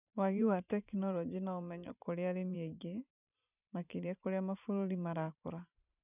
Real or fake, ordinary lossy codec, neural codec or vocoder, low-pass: fake; none; vocoder, 44.1 kHz, 128 mel bands every 512 samples, BigVGAN v2; 3.6 kHz